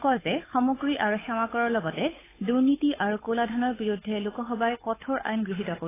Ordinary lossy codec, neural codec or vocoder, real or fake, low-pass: AAC, 16 kbps; codec, 16 kHz, 8 kbps, FunCodec, trained on Chinese and English, 25 frames a second; fake; 3.6 kHz